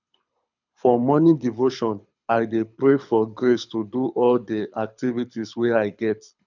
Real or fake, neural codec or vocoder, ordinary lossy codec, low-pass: fake; codec, 24 kHz, 6 kbps, HILCodec; none; 7.2 kHz